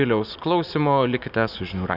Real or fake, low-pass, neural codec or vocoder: real; 5.4 kHz; none